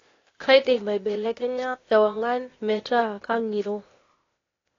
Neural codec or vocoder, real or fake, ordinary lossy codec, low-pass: codec, 16 kHz, 0.8 kbps, ZipCodec; fake; AAC, 32 kbps; 7.2 kHz